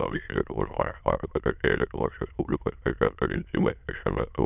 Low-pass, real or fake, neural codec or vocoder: 3.6 kHz; fake; autoencoder, 22.05 kHz, a latent of 192 numbers a frame, VITS, trained on many speakers